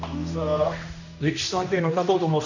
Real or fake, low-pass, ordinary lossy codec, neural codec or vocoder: fake; 7.2 kHz; none; codec, 16 kHz, 1 kbps, X-Codec, HuBERT features, trained on general audio